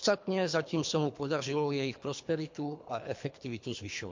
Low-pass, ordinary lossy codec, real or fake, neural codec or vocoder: 7.2 kHz; MP3, 48 kbps; fake; codec, 24 kHz, 3 kbps, HILCodec